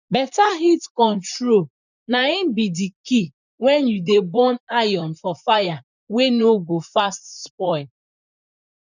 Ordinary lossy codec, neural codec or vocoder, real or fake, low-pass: none; vocoder, 44.1 kHz, 128 mel bands, Pupu-Vocoder; fake; 7.2 kHz